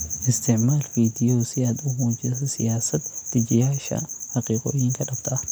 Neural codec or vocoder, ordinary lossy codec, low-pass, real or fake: none; none; none; real